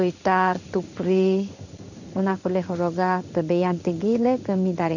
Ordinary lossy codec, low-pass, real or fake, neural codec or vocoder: none; 7.2 kHz; fake; codec, 16 kHz in and 24 kHz out, 1 kbps, XY-Tokenizer